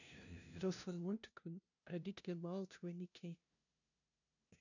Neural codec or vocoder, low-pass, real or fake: codec, 16 kHz, 0.5 kbps, FunCodec, trained on LibriTTS, 25 frames a second; 7.2 kHz; fake